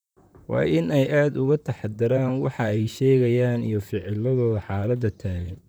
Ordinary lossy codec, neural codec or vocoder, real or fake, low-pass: none; vocoder, 44.1 kHz, 128 mel bands, Pupu-Vocoder; fake; none